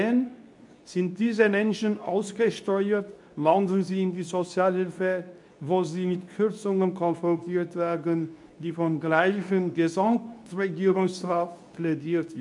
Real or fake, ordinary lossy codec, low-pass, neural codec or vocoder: fake; MP3, 96 kbps; 10.8 kHz; codec, 24 kHz, 0.9 kbps, WavTokenizer, medium speech release version 2